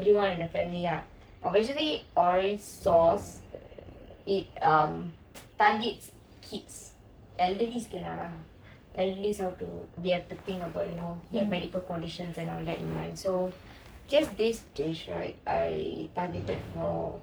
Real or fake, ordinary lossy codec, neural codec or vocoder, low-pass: fake; none; codec, 44.1 kHz, 3.4 kbps, Pupu-Codec; none